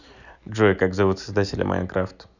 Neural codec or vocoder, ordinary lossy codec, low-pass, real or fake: none; none; 7.2 kHz; real